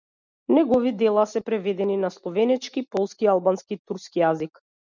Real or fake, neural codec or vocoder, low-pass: real; none; 7.2 kHz